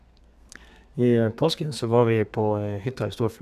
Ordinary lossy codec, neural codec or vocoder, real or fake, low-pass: none; codec, 32 kHz, 1.9 kbps, SNAC; fake; 14.4 kHz